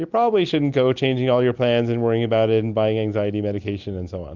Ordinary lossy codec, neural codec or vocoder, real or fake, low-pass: Opus, 64 kbps; none; real; 7.2 kHz